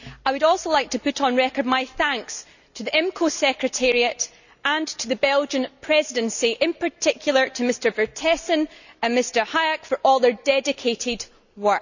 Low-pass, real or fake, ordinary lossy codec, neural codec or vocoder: 7.2 kHz; real; none; none